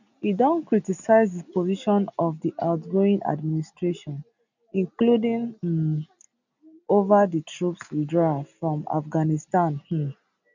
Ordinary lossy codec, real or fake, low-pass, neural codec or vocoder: none; real; 7.2 kHz; none